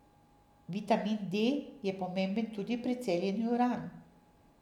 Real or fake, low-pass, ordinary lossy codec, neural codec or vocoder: real; 19.8 kHz; none; none